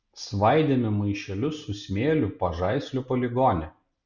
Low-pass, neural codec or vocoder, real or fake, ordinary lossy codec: 7.2 kHz; none; real; Opus, 64 kbps